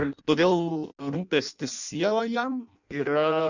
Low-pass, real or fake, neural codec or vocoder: 7.2 kHz; fake; codec, 16 kHz in and 24 kHz out, 0.6 kbps, FireRedTTS-2 codec